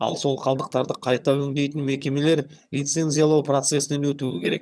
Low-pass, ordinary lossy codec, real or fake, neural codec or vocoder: none; none; fake; vocoder, 22.05 kHz, 80 mel bands, HiFi-GAN